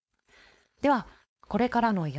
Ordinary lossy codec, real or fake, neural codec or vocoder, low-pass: none; fake; codec, 16 kHz, 4.8 kbps, FACodec; none